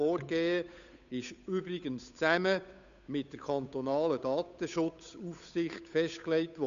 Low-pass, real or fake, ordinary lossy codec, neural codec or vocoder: 7.2 kHz; fake; none; codec, 16 kHz, 8 kbps, FunCodec, trained on Chinese and English, 25 frames a second